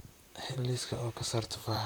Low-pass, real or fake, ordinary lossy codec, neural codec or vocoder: none; fake; none; vocoder, 44.1 kHz, 128 mel bands, Pupu-Vocoder